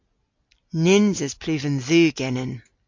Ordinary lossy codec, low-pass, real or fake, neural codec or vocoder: MP3, 48 kbps; 7.2 kHz; real; none